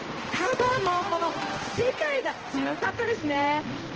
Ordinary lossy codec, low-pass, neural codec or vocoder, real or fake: Opus, 16 kbps; 7.2 kHz; codec, 16 kHz, 1 kbps, X-Codec, HuBERT features, trained on balanced general audio; fake